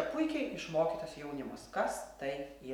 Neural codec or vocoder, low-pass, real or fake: none; 19.8 kHz; real